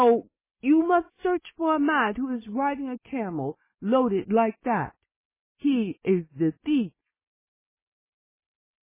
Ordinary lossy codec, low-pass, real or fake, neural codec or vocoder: MP3, 16 kbps; 3.6 kHz; real; none